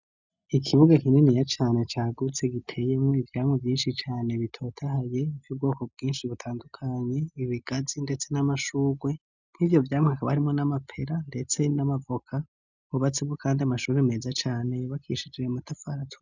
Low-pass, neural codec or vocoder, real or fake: 7.2 kHz; none; real